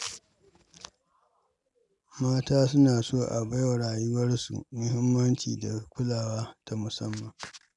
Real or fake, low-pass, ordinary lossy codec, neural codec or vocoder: real; 10.8 kHz; none; none